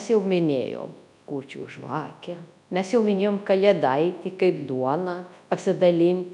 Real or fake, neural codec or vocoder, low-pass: fake; codec, 24 kHz, 0.9 kbps, WavTokenizer, large speech release; 10.8 kHz